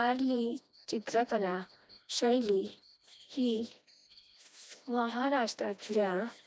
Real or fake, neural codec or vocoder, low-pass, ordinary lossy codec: fake; codec, 16 kHz, 1 kbps, FreqCodec, smaller model; none; none